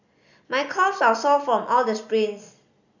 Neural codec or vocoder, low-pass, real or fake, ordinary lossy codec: none; 7.2 kHz; real; none